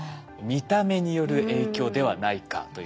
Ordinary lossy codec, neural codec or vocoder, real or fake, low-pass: none; none; real; none